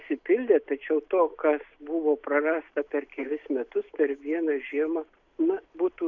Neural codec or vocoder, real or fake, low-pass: none; real; 7.2 kHz